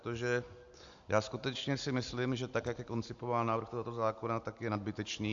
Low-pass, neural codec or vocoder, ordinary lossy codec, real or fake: 7.2 kHz; none; AAC, 64 kbps; real